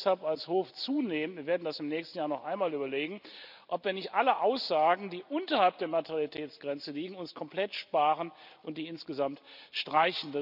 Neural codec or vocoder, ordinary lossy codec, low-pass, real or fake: none; none; 5.4 kHz; real